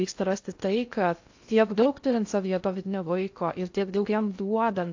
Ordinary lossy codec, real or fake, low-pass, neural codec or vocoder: AAC, 48 kbps; fake; 7.2 kHz; codec, 16 kHz in and 24 kHz out, 0.6 kbps, FocalCodec, streaming, 4096 codes